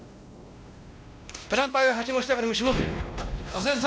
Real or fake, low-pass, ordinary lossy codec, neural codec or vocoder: fake; none; none; codec, 16 kHz, 1 kbps, X-Codec, WavLM features, trained on Multilingual LibriSpeech